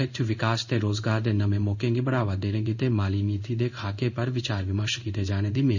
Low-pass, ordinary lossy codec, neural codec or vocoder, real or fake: 7.2 kHz; none; codec, 16 kHz in and 24 kHz out, 1 kbps, XY-Tokenizer; fake